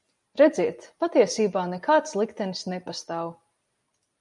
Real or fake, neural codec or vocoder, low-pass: real; none; 10.8 kHz